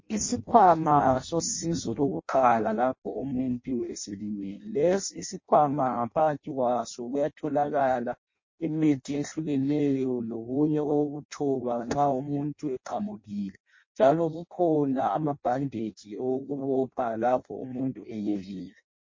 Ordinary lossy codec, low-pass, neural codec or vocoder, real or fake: MP3, 32 kbps; 7.2 kHz; codec, 16 kHz in and 24 kHz out, 0.6 kbps, FireRedTTS-2 codec; fake